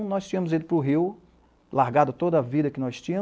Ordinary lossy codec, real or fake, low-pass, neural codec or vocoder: none; real; none; none